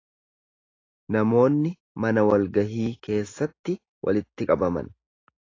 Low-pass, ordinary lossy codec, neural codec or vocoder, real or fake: 7.2 kHz; AAC, 32 kbps; none; real